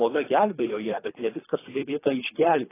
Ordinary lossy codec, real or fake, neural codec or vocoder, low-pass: AAC, 16 kbps; fake; codec, 24 kHz, 3 kbps, HILCodec; 3.6 kHz